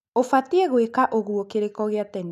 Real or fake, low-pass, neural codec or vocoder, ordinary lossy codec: real; 14.4 kHz; none; none